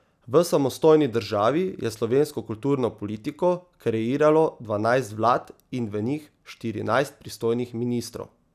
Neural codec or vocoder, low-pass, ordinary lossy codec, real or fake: none; 14.4 kHz; none; real